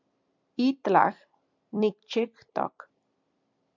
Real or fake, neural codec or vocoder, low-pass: fake; vocoder, 44.1 kHz, 128 mel bands every 256 samples, BigVGAN v2; 7.2 kHz